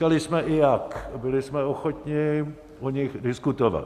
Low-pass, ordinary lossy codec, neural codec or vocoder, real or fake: 14.4 kHz; Opus, 64 kbps; none; real